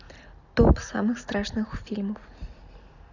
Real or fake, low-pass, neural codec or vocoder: real; 7.2 kHz; none